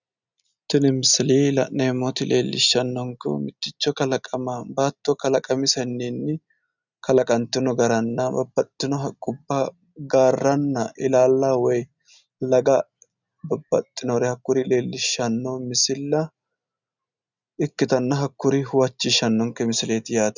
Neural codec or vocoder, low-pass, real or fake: none; 7.2 kHz; real